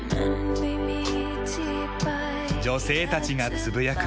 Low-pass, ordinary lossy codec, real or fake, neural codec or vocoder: none; none; real; none